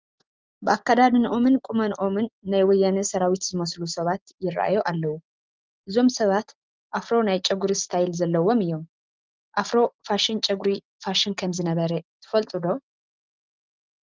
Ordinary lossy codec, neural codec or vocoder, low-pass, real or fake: Opus, 32 kbps; none; 7.2 kHz; real